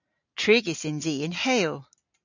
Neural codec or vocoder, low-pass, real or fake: none; 7.2 kHz; real